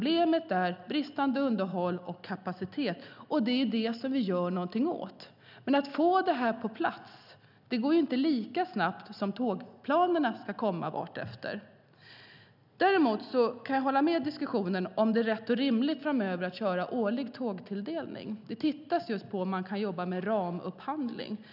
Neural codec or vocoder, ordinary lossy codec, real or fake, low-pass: none; none; real; 5.4 kHz